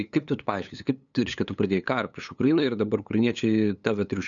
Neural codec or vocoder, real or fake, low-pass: codec, 16 kHz, 8 kbps, FunCodec, trained on LibriTTS, 25 frames a second; fake; 7.2 kHz